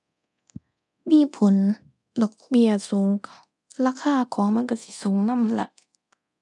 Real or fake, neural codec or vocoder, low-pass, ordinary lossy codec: fake; codec, 24 kHz, 0.9 kbps, DualCodec; 10.8 kHz; none